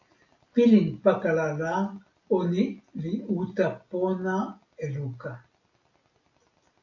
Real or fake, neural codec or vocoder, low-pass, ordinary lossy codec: real; none; 7.2 kHz; AAC, 48 kbps